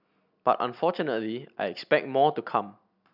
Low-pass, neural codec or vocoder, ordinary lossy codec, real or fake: 5.4 kHz; none; none; real